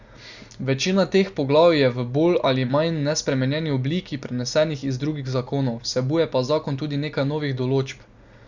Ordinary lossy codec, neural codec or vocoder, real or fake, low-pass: Opus, 64 kbps; none; real; 7.2 kHz